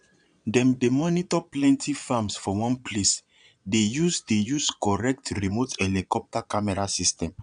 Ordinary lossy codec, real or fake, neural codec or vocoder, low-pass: none; fake; vocoder, 22.05 kHz, 80 mel bands, Vocos; 9.9 kHz